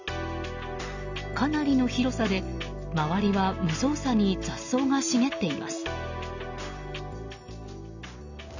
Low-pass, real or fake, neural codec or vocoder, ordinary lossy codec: 7.2 kHz; real; none; none